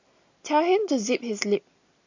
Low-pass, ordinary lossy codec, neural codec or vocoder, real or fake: 7.2 kHz; AAC, 48 kbps; none; real